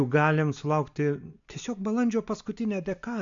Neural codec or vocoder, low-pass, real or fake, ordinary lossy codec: none; 7.2 kHz; real; AAC, 64 kbps